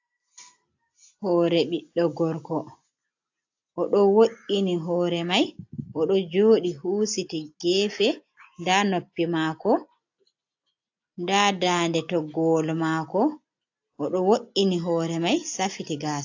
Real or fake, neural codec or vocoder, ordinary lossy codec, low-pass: real; none; AAC, 48 kbps; 7.2 kHz